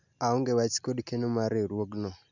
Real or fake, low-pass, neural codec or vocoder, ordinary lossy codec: real; 7.2 kHz; none; none